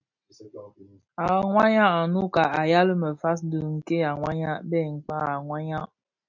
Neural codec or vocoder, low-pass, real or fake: none; 7.2 kHz; real